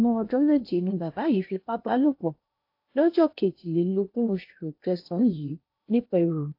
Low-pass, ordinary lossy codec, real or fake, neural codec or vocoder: 5.4 kHz; AAC, 32 kbps; fake; codec, 16 kHz in and 24 kHz out, 0.8 kbps, FocalCodec, streaming, 65536 codes